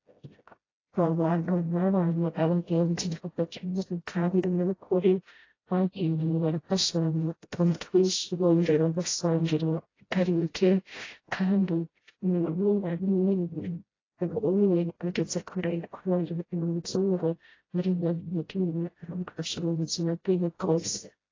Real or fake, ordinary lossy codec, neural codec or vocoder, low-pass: fake; AAC, 32 kbps; codec, 16 kHz, 0.5 kbps, FreqCodec, smaller model; 7.2 kHz